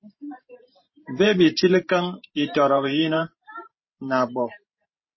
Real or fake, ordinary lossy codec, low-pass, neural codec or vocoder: fake; MP3, 24 kbps; 7.2 kHz; vocoder, 44.1 kHz, 128 mel bands every 512 samples, BigVGAN v2